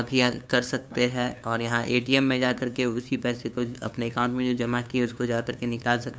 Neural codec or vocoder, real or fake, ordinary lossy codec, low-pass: codec, 16 kHz, 2 kbps, FunCodec, trained on LibriTTS, 25 frames a second; fake; none; none